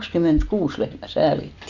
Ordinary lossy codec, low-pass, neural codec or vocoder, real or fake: none; 7.2 kHz; none; real